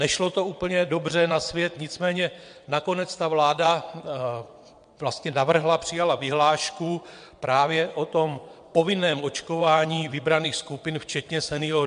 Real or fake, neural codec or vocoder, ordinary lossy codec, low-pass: fake; vocoder, 22.05 kHz, 80 mel bands, WaveNeXt; MP3, 64 kbps; 9.9 kHz